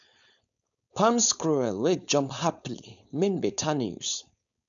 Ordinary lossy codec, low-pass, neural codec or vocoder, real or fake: none; 7.2 kHz; codec, 16 kHz, 4.8 kbps, FACodec; fake